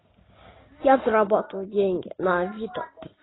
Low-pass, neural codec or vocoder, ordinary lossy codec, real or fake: 7.2 kHz; none; AAC, 16 kbps; real